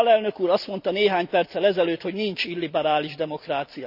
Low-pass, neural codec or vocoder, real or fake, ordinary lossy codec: 5.4 kHz; none; real; none